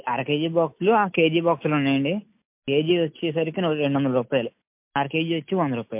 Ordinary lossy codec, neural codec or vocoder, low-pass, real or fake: MP3, 32 kbps; none; 3.6 kHz; real